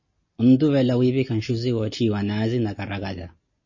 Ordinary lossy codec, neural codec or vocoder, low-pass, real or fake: MP3, 32 kbps; none; 7.2 kHz; real